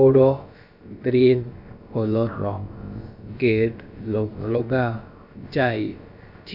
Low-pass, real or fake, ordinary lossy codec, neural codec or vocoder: 5.4 kHz; fake; none; codec, 16 kHz, about 1 kbps, DyCAST, with the encoder's durations